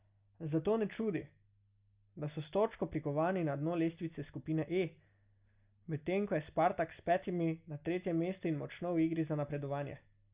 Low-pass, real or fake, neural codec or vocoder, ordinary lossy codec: 3.6 kHz; real; none; Opus, 64 kbps